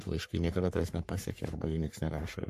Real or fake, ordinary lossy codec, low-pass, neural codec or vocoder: fake; MP3, 64 kbps; 14.4 kHz; codec, 44.1 kHz, 3.4 kbps, Pupu-Codec